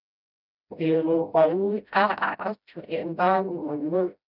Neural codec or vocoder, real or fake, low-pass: codec, 16 kHz, 0.5 kbps, FreqCodec, smaller model; fake; 5.4 kHz